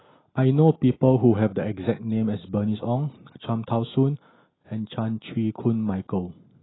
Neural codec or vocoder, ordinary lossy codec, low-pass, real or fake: none; AAC, 16 kbps; 7.2 kHz; real